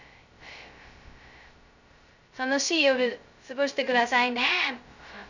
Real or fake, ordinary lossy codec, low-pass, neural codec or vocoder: fake; none; 7.2 kHz; codec, 16 kHz, 0.2 kbps, FocalCodec